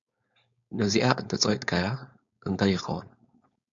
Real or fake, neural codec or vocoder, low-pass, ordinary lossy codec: fake; codec, 16 kHz, 4.8 kbps, FACodec; 7.2 kHz; MP3, 96 kbps